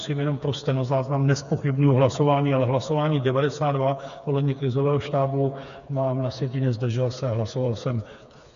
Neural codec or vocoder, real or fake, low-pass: codec, 16 kHz, 4 kbps, FreqCodec, smaller model; fake; 7.2 kHz